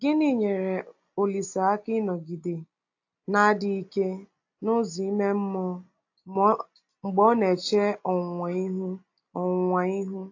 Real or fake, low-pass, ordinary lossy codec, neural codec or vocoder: real; 7.2 kHz; AAC, 48 kbps; none